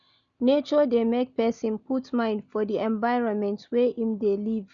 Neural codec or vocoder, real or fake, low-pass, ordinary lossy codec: none; real; 7.2 kHz; none